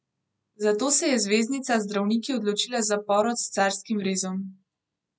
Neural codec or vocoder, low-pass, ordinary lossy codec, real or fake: none; none; none; real